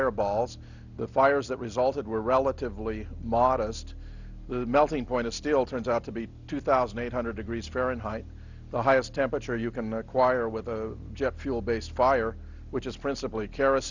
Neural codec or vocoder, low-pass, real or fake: none; 7.2 kHz; real